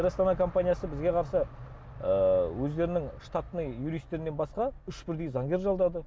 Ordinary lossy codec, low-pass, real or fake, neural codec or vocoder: none; none; real; none